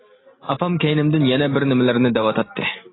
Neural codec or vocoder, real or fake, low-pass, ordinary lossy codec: none; real; 7.2 kHz; AAC, 16 kbps